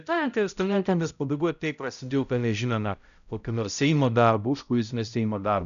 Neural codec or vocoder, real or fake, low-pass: codec, 16 kHz, 0.5 kbps, X-Codec, HuBERT features, trained on balanced general audio; fake; 7.2 kHz